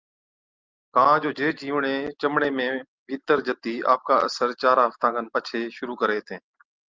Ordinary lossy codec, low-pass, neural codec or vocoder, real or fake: Opus, 24 kbps; 7.2 kHz; none; real